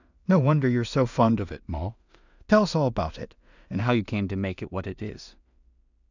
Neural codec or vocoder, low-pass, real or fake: codec, 16 kHz in and 24 kHz out, 0.4 kbps, LongCat-Audio-Codec, two codebook decoder; 7.2 kHz; fake